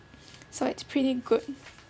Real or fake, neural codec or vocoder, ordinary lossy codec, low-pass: real; none; none; none